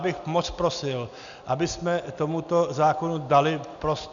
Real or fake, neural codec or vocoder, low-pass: real; none; 7.2 kHz